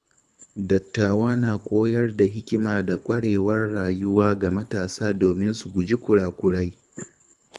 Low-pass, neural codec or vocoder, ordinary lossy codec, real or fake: none; codec, 24 kHz, 3 kbps, HILCodec; none; fake